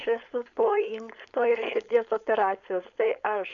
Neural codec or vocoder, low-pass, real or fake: codec, 16 kHz, 4.8 kbps, FACodec; 7.2 kHz; fake